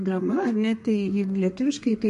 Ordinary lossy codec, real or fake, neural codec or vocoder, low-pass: MP3, 48 kbps; fake; codec, 32 kHz, 1.9 kbps, SNAC; 14.4 kHz